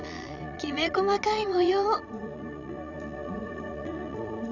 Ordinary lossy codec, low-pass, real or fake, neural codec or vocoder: none; 7.2 kHz; fake; vocoder, 22.05 kHz, 80 mel bands, Vocos